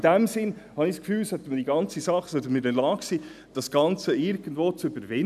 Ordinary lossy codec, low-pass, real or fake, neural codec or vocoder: none; 14.4 kHz; real; none